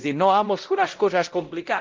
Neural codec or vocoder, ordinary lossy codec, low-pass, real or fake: codec, 16 kHz, 0.5 kbps, X-Codec, WavLM features, trained on Multilingual LibriSpeech; Opus, 16 kbps; 7.2 kHz; fake